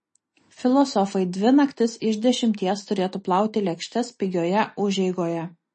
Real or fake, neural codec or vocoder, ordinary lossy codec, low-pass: real; none; MP3, 32 kbps; 10.8 kHz